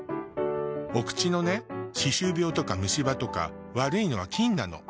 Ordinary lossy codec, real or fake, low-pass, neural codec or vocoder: none; real; none; none